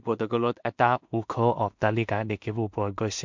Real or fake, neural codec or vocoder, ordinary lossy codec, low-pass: fake; codec, 16 kHz in and 24 kHz out, 0.4 kbps, LongCat-Audio-Codec, two codebook decoder; MP3, 64 kbps; 7.2 kHz